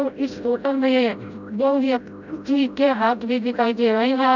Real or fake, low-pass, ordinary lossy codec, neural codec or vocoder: fake; 7.2 kHz; none; codec, 16 kHz, 0.5 kbps, FreqCodec, smaller model